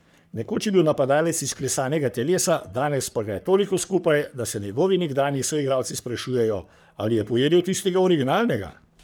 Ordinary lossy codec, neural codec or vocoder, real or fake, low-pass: none; codec, 44.1 kHz, 3.4 kbps, Pupu-Codec; fake; none